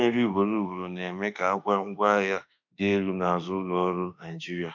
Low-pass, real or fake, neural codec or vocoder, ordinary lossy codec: 7.2 kHz; fake; autoencoder, 48 kHz, 32 numbers a frame, DAC-VAE, trained on Japanese speech; MP3, 64 kbps